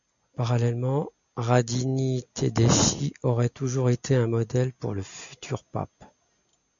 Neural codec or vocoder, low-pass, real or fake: none; 7.2 kHz; real